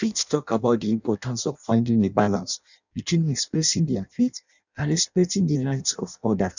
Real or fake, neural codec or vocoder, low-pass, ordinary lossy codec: fake; codec, 16 kHz in and 24 kHz out, 0.6 kbps, FireRedTTS-2 codec; 7.2 kHz; none